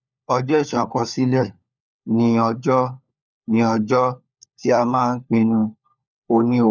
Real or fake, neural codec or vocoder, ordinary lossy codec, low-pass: fake; codec, 16 kHz, 4 kbps, FunCodec, trained on LibriTTS, 50 frames a second; none; 7.2 kHz